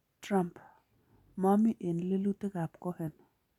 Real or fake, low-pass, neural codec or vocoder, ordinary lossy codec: real; 19.8 kHz; none; none